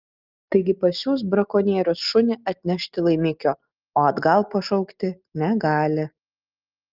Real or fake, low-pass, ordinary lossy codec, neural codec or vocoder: real; 5.4 kHz; Opus, 24 kbps; none